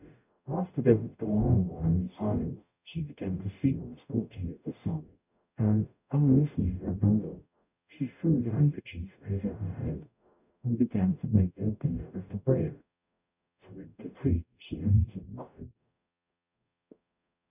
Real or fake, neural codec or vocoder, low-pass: fake; codec, 44.1 kHz, 0.9 kbps, DAC; 3.6 kHz